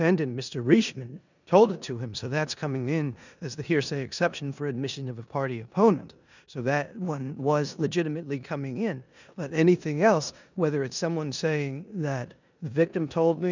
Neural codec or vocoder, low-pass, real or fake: codec, 16 kHz in and 24 kHz out, 0.9 kbps, LongCat-Audio-Codec, four codebook decoder; 7.2 kHz; fake